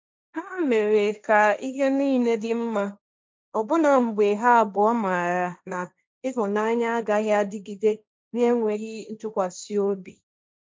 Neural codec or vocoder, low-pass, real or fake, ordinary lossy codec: codec, 16 kHz, 1.1 kbps, Voila-Tokenizer; none; fake; none